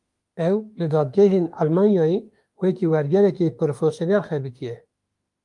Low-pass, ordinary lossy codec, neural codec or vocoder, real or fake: 10.8 kHz; Opus, 32 kbps; autoencoder, 48 kHz, 32 numbers a frame, DAC-VAE, trained on Japanese speech; fake